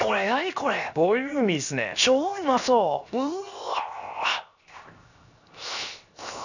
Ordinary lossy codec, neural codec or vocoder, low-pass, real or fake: none; codec, 16 kHz, 0.7 kbps, FocalCodec; 7.2 kHz; fake